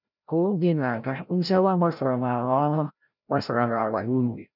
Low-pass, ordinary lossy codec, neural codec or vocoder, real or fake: 5.4 kHz; none; codec, 16 kHz, 0.5 kbps, FreqCodec, larger model; fake